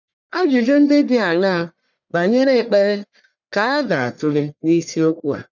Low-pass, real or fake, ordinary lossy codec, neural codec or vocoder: 7.2 kHz; fake; none; codec, 44.1 kHz, 1.7 kbps, Pupu-Codec